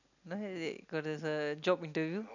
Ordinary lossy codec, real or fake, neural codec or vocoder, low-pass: none; real; none; 7.2 kHz